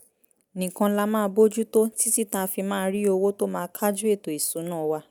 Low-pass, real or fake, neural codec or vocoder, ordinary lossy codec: none; real; none; none